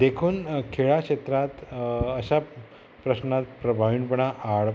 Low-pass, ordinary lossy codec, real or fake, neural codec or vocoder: none; none; real; none